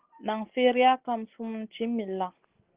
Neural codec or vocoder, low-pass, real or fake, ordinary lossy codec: none; 3.6 kHz; real; Opus, 16 kbps